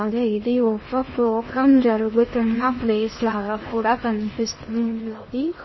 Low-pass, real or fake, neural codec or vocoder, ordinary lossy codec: 7.2 kHz; fake; codec, 16 kHz in and 24 kHz out, 0.8 kbps, FocalCodec, streaming, 65536 codes; MP3, 24 kbps